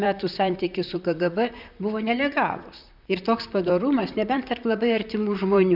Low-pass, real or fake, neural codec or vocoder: 5.4 kHz; fake; vocoder, 44.1 kHz, 128 mel bands, Pupu-Vocoder